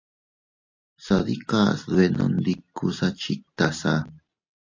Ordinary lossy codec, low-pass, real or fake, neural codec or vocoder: AAC, 48 kbps; 7.2 kHz; real; none